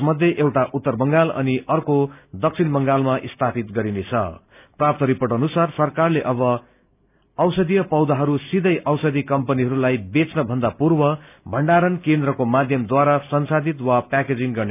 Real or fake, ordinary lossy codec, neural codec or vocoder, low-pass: real; none; none; 3.6 kHz